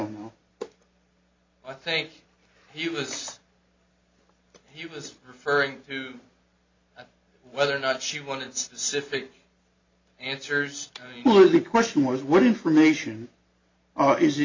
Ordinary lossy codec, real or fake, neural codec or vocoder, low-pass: MP3, 48 kbps; real; none; 7.2 kHz